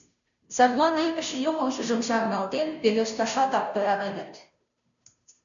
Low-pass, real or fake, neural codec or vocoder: 7.2 kHz; fake; codec, 16 kHz, 0.5 kbps, FunCodec, trained on Chinese and English, 25 frames a second